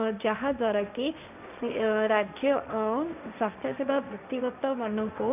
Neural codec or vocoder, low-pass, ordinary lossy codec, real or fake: codec, 16 kHz, 1.1 kbps, Voila-Tokenizer; 3.6 kHz; none; fake